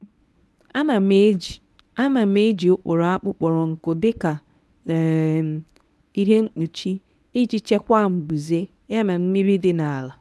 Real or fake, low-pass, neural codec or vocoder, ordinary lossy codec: fake; none; codec, 24 kHz, 0.9 kbps, WavTokenizer, medium speech release version 1; none